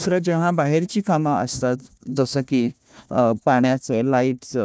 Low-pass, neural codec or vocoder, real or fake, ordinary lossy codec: none; codec, 16 kHz, 1 kbps, FunCodec, trained on Chinese and English, 50 frames a second; fake; none